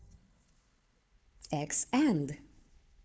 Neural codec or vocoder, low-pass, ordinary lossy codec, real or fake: codec, 16 kHz, 4 kbps, FunCodec, trained on Chinese and English, 50 frames a second; none; none; fake